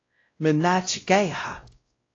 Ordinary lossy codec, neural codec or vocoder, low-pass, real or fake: AAC, 32 kbps; codec, 16 kHz, 0.5 kbps, X-Codec, WavLM features, trained on Multilingual LibriSpeech; 7.2 kHz; fake